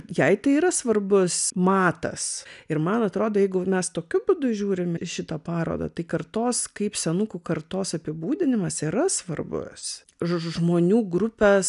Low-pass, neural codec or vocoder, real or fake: 10.8 kHz; none; real